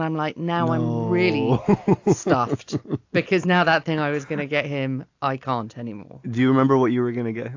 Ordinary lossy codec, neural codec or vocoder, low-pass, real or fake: AAC, 48 kbps; none; 7.2 kHz; real